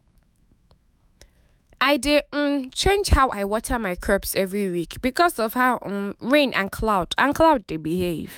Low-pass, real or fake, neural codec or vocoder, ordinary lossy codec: 19.8 kHz; fake; autoencoder, 48 kHz, 128 numbers a frame, DAC-VAE, trained on Japanese speech; none